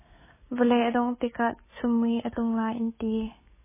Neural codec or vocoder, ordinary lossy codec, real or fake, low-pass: codec, 16 kHz, 6 kbps, DAC; MP3, 16 kbps; fake; 3.6 kHz